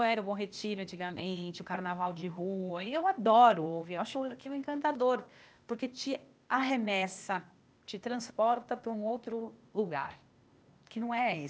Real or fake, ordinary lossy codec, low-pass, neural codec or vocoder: fake; none; none; codec, 16 kHz, 0.8 kbps, ZipCodec